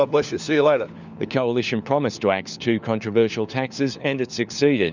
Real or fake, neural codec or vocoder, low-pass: fake; codec, 16 kHz, 4 kbps, FunCodec, trained on LibriTTS, 50 frames a second; 7.2 kHz